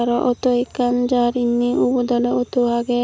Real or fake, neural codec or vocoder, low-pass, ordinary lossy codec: real; none; none; none